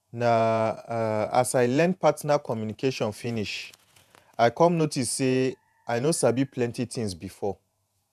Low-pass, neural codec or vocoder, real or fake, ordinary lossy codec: 14.4 kHz; vocoder, 48 kHz, 128 mel bands, Vocos; fake; none